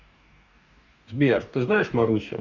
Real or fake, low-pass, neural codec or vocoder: fake; 7.2 kHz; codec, 44.1 kHz, 2.6 kbps, DAC